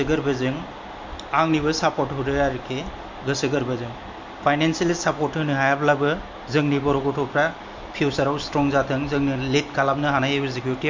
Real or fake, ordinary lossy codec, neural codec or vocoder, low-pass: real; MP3, 48 kbps; none; 7.2 kHz